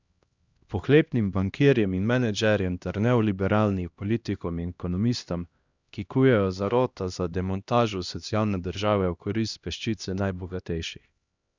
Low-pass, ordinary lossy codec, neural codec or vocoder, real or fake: 7.2 kHz; Opus, 64 kbps; codec, 16 kHz, 1 kbps, X-Codec, HuBERT features, trained on LibriSpeech; fake